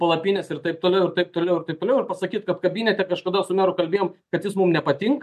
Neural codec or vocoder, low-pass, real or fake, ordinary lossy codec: none; 14.4 kHz; real; MP3, 64 kbps